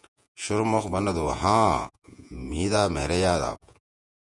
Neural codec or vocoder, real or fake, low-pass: vocoder, 48 kHz, 128 mel bands, Vocos; fake; 10.8 kHz